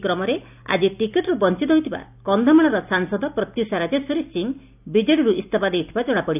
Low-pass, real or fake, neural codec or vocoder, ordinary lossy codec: 3.6 kHz; real; none; none